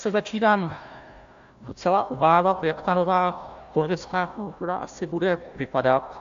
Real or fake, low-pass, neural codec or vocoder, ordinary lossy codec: fake; 7.2 kHz; codec, 16 kHz, 1 kbps, FunCodec, trained on Chinese and English, 50 frames a second; AAC, 64 kbps